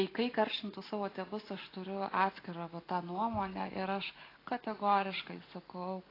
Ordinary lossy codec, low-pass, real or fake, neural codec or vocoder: AAC, 24 kbps; 5.4 kHz; real; none